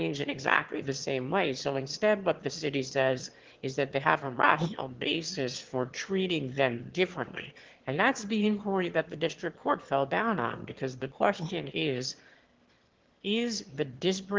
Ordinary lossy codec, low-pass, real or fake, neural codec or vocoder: Opus, 16 kbps; 7.2 kHz; fake; autoencoder, 22.05 kHz, a latent of 192 numbers a frame, VITS, trained on one speaker